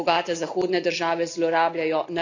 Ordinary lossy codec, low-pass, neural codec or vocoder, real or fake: none; 7.2 kHz; none; real